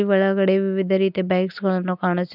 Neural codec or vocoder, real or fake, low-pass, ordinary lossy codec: none; real; 5.4 kHz; none